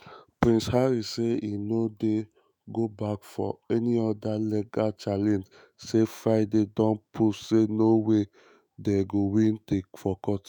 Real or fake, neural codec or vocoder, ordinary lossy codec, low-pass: fake; autoencoder, 48 kHz, 128 numbers a frame, DAC-VAE, trained on Japanese speech; none; none